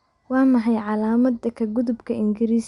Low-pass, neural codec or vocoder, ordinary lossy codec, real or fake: 10.8 kHz; none; Opus, 64 kbps; real